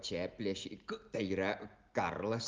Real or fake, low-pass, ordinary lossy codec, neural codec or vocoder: real; 7.2 kHz; Opus, 32 kbps; none